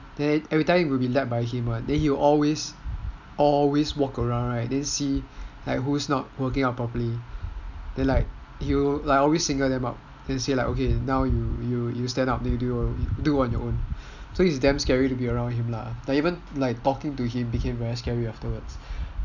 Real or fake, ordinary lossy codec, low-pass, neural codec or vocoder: real; none; 7.2 kHz; none